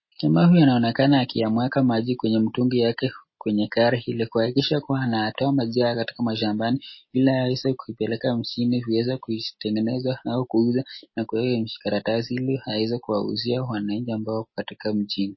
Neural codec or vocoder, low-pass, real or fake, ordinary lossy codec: none; 7.2 kHz; real; MP3, 24 kbps